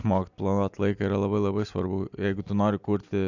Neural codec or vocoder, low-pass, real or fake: none; 7.2 kHz; real